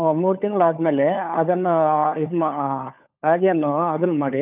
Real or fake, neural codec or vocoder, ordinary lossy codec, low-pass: fake; codec, 16 kHz, 4 kbps, FunCodec, trained on LibriTTS, 50 frames a second; none; 3.6 kHz